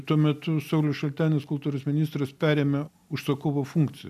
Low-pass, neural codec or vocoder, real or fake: 14.4 kHz; none; real